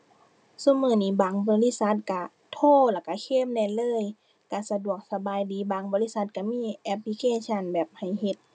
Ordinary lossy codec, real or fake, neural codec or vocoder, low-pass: none; real; none; none